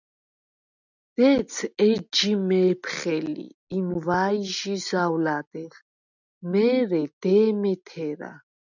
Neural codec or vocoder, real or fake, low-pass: none; real; 7.2 kHz